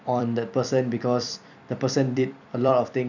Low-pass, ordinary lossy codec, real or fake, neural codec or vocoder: 7.2 kHz; none; fake; vocoder, 44.1 kHz, 128 mel bands every 256 samples, BigVGAN v2